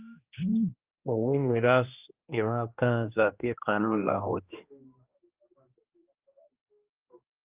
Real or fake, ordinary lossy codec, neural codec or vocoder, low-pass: fake; Opus, 24 kbps; codec, 16 kHz, 1 kbps, X-Codec, HuBERT features, trained on general audio; 3.6 kHz